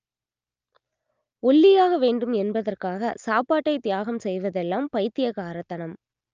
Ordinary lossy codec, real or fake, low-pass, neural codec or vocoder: Opus, 24 kbps; real; 7.2 kHz; none